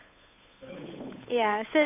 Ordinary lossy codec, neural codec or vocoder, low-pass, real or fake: AAC, 24 kbps; codec, 16 kHz, 8 kbps, FunCodec, trained on Chinese and English, 25 frames a second; 3.6 kHz; fake